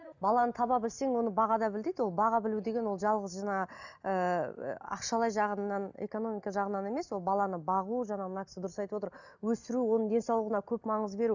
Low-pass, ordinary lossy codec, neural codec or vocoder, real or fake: 7.2 kHz; none; none; real